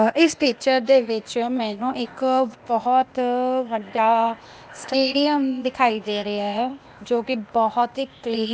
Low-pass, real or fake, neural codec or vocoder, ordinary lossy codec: none; fake; codec, 16 kHz, 0.8 kbps, ZipCodec; none